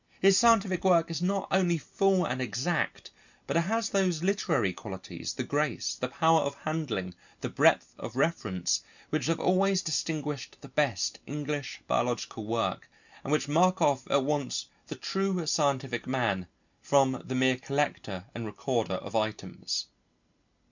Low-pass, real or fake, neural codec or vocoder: 7.2 kHz; real; none